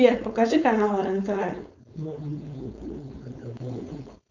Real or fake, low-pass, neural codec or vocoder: fake; 7.2 kHz; codec, 16 kHz, 4.8 kbps, FACodec